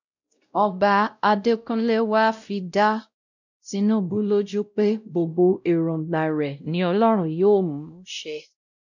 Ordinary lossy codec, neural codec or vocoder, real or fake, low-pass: none; codec, 16 kHz, 0.5 kbps, X-Codec, WavLM features, trained on Multilingual LibriSpeech; fake; 7.2 kHz